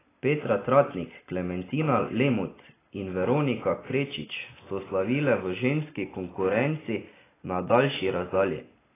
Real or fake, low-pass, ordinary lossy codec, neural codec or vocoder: real; 3.6 kHz; AAC, 16 kbps; none